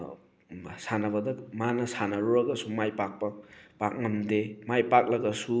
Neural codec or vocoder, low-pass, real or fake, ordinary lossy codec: none; none; real; none